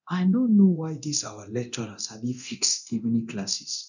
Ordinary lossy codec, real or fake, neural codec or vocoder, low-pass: none; fake; codec, 24 kHz, 0.9 kbps, DualCodec; 7.2 kHz